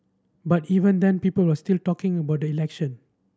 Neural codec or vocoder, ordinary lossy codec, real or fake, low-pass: none; none; real; none